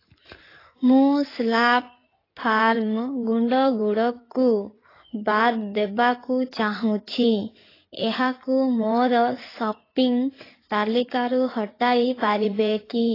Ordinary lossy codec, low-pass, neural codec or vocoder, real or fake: AAC, 24 kbps; 5.4 kHz; codec, 16 kHz in and 24 kHz out, 2.2 kbps, FireRedTTS-2 codec; fake